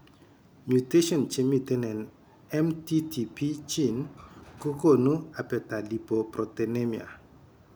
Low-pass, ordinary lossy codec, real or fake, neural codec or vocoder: none; none; real; none